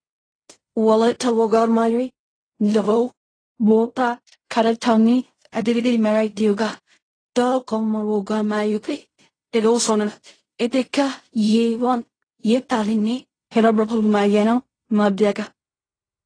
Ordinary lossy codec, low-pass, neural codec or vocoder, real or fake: AAC, 32 kbps; 9.9 kHz; codec, 16 kHz in and 24 kHz out, 0.4 kbps, LongCat-Audio-Codec, fine tuned four codebook decoder; fake